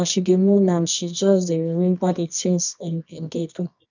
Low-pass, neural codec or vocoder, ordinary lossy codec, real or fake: 7.2 kHz; codec, 24 kHz, 0.9 kbps, WavTokenizer, medium music audio release; none; fake